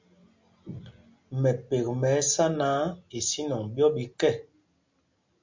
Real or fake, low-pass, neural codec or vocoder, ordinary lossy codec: real; 7.2 kHz; none; MP3, 64 kbps